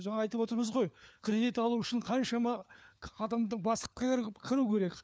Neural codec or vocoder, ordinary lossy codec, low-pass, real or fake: codec, 16 kHz, 4 kbps, FunCodec, trained on LibriTTS, 50 frames a second; none; none; fake